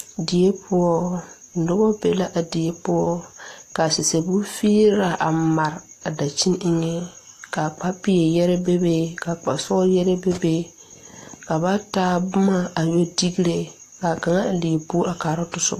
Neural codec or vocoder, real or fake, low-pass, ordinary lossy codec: none; real; 14.4 kHz; AAC, 48 kbps